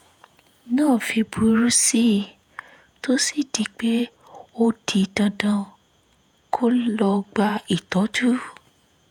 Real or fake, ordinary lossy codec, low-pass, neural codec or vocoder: fake; none; 19.8 kHz; vocoder, 48 kHz, 128 mel bands, Vocos